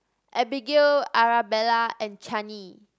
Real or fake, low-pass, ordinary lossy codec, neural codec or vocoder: real; none; none; none